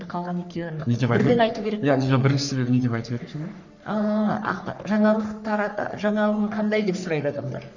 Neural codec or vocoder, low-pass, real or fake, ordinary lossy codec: codec, 44.1 kHz, 3.4 kbps, Pupu-Codec; 7.2 kHz; fake; none